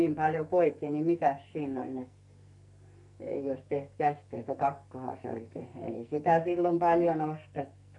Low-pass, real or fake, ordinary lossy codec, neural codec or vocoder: 10.8 kHz; fake; none; codec, 44.1 kHz, 2.6 kbps, SNAC